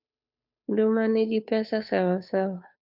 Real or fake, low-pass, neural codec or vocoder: fake; 5.4 kHz; codec, 16 kHz, 2 kbps, FunCodec, trained on Chinese and English, 25 frames a second